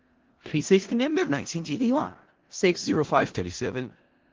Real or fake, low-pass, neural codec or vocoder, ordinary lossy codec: fake; 7.2 kHz; codec, 16 kHz in and 24 kHz out, 0.4 kbps, LongCat-Audio-Codec, four codebook decoder; Opus, 16 kbps